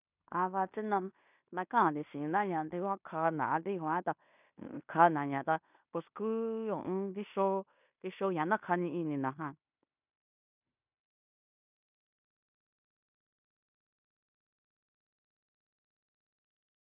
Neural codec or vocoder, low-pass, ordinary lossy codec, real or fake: codec, 16 kHz in and 24 kHz out, 0.9 kbps, LongCat-Audio-Codec, fine tuned four codebook decoder; 3.6 kHz; none; fake